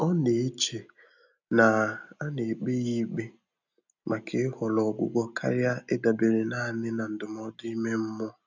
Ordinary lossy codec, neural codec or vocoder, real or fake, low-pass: none; none; real; 7.2 kHz